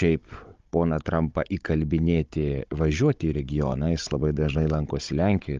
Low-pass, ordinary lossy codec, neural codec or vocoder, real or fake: 7.2 kHz; Opus, 32 kbps; codec, 16 kHz, 16 kbps, FunCodec, trained on Chinese and English, 50 frames a second; fake